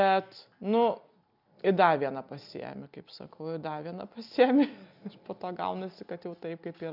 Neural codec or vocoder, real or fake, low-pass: none; real; 5.4 kHz